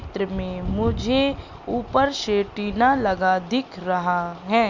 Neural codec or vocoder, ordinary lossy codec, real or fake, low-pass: none; Opus, 64 kbps; real; 7.2 kHz